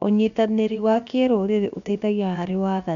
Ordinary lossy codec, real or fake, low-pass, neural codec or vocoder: none; fake; 7.2 kHz; codec, 16 kHz, 0.7 kbps, FocalCodec